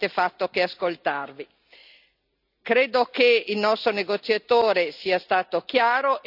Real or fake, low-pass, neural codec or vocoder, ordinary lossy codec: real; 5.4 kHz; none; none